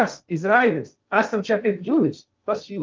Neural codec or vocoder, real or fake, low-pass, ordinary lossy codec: codec, 16 kHz in and 24 kHz out, 0.6 kbps, FocalCodec, streaming, 2048 codes; fake; 7.2 kHz; Opus, 32 kbps